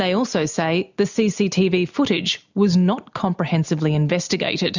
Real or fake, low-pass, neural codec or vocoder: real; 7.2 kHz; none